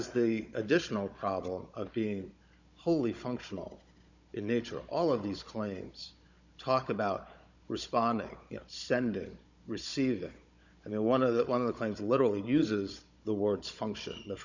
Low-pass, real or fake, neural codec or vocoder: 7.2 kHz; fake; codec, 16 kHz, 16 kbps, FunCodec, trained on Chinese and English, 50 frames a second